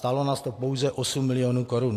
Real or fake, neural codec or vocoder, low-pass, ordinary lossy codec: real; none; 14.4 kHz; AAC, 64 kbps